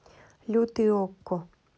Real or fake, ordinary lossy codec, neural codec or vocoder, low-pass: real; none; none; none